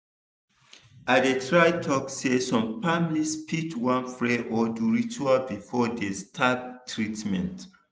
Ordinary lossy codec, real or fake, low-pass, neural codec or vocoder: none; real; none; none